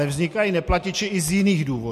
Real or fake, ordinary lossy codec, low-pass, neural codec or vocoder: real; MP3, 64 kbps; 14.4 kHz; none